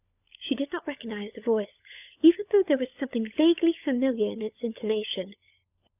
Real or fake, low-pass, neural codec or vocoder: fake; 3.6 kHz; codec, 16 kHz, 16 kbps, FunCodec, trained on LibriTTS, 50 frames a second